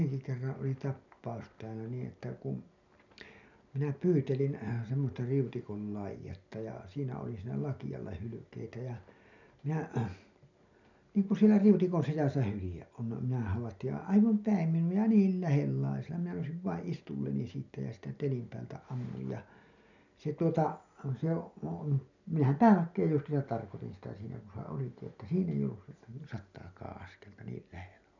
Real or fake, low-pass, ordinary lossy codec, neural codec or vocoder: real; 7.2 kHz; none; none